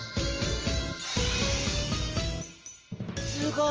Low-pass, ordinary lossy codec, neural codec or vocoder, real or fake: 7.2 kHz; Opus, 24 kbps; none; real